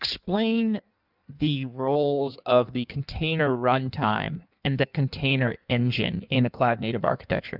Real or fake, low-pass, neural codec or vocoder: fake; 5.4 kHz; codec, 16 kHz in and 24 kHz out, 1.1 kbps, FireRedTTS-2 codec